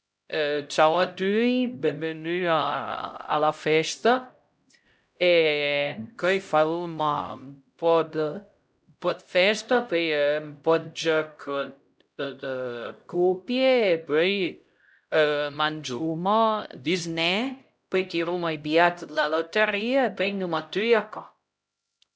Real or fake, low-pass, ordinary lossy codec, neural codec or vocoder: fake; none; none; codec, 16 kHz, 0.5 kbps, X-Codec, HuBERT features, trained on LibriSpeech